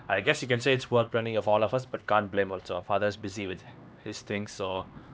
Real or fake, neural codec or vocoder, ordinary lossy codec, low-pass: fake; codec, 16 kHz, 2 kbps, X-Codec, HuBERT features, trained on LibriSpeech; none; none